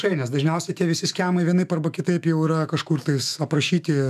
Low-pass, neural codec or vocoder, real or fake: 14.4 kHz; autoencoder, 48 kHz, 128 numbers a frame, DAC-VAE, trained on Japanese speech; fake